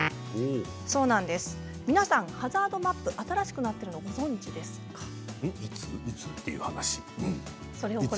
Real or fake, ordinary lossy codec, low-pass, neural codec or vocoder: real; none; none; none